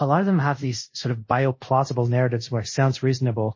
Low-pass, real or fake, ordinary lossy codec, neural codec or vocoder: 7.2 kHz; fake; MP3, 32 kbps; codec, 24 kHz, 0.5 kbps, DualCodec